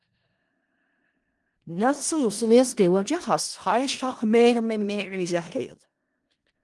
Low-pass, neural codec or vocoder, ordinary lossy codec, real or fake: 10.8 kHz; codec, 16 kHz in and 24 kHz out, 0.4 kbps, LongCat-Audio-Codec, four codebook decoder; Opus, 24 kbps; fake